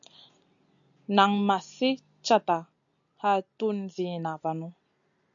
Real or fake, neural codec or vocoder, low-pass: real; none; 7.2 kHz